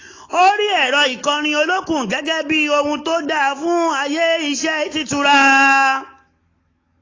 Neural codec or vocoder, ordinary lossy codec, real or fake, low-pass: autoencoder, 48 kHz, 128 numbers a frame, DAC-VAE, trained on Japanese speech; AAC, 32 kbps; fake; 7.2 kHz